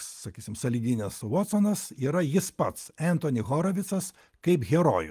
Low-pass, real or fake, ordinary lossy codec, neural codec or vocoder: 14.4 kHz; real; Opus, 32 kbps; none